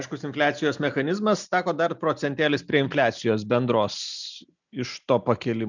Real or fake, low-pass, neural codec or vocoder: real; 7.2 kHz; none